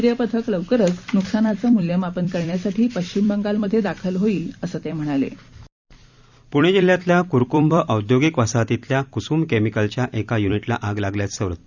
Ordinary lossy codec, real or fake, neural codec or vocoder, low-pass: Opus, 64 kbps; fake; vocoder, 44.1 kHz, 128 mel bands every 256 samples, BigVGAN v2; 7.2 kHz